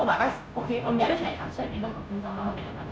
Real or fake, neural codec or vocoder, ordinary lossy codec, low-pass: fake; codec, 16 kHz, 0.5 kbps, FunCodec, trained on Chinese and English, 25 frames a second; none; none